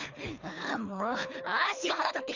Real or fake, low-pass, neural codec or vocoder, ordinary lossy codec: fake; 7.2 kHz; codec, 24 kHz, 3 kbps, HILCodec; Opus, 64 kbps